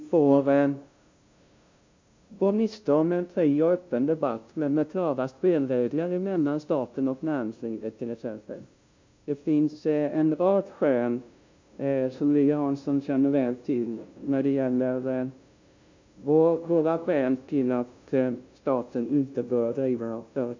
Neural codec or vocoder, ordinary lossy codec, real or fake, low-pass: codec, 16 kHz, 0.5 kbps, FunCodec, trained on LibriTTS, 25 frames a second; MP3, 64 kbps; fake; 7.2 kHz